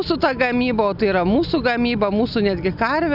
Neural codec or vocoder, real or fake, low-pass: none; real; 5.4 kHz